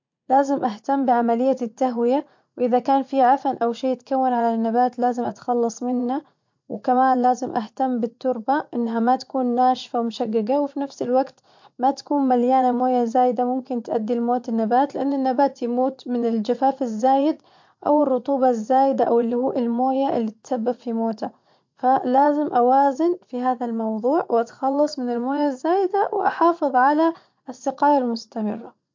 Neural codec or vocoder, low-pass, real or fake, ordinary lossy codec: vocoder, 44.1 kHz, 80 mel bands, Vocos; 7.2 kHz; fake; MP3, 48 kbps